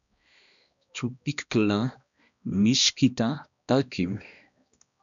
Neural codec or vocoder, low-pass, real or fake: codec, 16 kHz, 1 kbps, X-Codec, HuBERT features, trained on balanced general audio; 7.2 kHz; fake